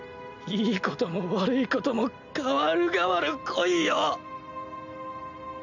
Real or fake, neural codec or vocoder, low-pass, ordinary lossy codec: real; none; 7.2 kHz; none